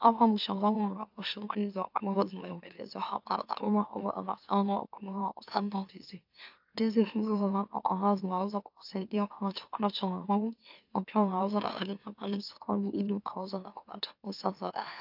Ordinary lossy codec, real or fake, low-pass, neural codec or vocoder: AAC, 48 kbps; fake; 5.4 kHz; autoencoder, 44.1 kHz, a latent of 192 numbers a frame, MeloTTS